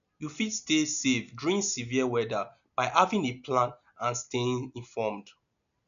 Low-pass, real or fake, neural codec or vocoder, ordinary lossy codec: 7.2 kHz; real; none; none